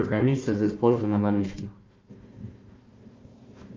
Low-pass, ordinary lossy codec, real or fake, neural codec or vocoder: 7.2 kHz; Opus, 32 kbps; fake; codec, 16 kHz, 1 kbps, FunCodec, trained on Chinese and English, 50 frames a second